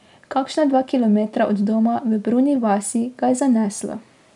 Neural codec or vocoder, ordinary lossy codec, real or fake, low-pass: none; none; real; 10.8 kHz